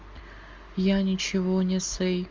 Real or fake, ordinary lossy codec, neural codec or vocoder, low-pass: real; Opus, 32 kbps; none; 7.2 kHz